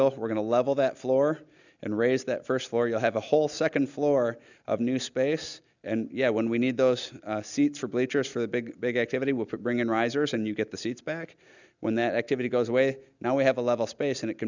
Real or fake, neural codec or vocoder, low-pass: real; none; 7.2 kHz